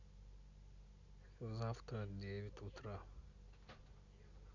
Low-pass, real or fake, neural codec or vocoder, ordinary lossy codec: 7.2 kHz; real; none; none